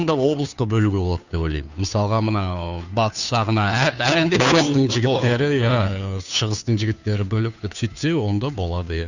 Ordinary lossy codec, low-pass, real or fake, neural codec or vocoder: none; 7.2 kHz; fake; codec, 16 kHz, 2 kbps, FunCodec, trained on Chinese and English, 25 frames a second